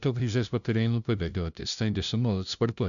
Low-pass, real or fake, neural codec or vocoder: 7.2 kHz; fake; codec, 16 kHz, 0.5 kbps, FunCodec, trained on LibriTTS, 25 frames a second